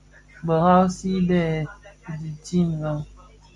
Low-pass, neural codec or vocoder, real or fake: 10.8 kHz; none; real